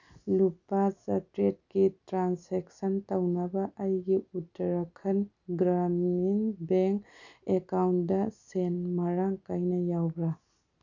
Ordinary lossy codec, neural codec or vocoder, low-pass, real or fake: none; none; 7.2 kHz; real